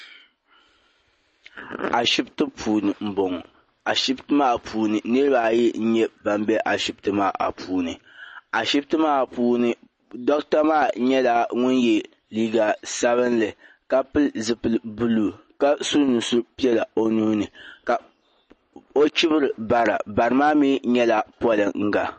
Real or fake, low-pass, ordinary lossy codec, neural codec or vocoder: real; 10.8 kHz; MP3, 32 kbps; none